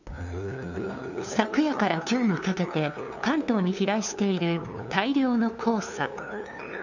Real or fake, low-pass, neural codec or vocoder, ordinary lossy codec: fake; 7.2 kHz; codec, 16 kHz, 2 kbps, FunCodec, trained on LibriTTS, 25 frames a second; none